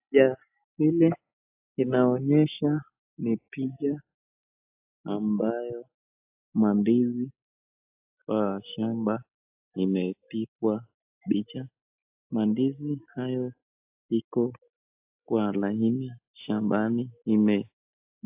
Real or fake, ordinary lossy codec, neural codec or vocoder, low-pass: real; AAC, 32 kbps; none; 3.6 kHz